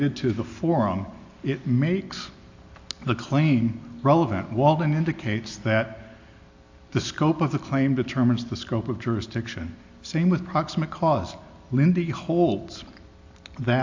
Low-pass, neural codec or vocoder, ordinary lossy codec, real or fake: 7.2 kHz; none; AAC, 48 kbps; real